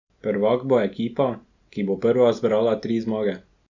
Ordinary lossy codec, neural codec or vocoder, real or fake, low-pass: Opus, 64 kbps; none; real; 7.2 kHz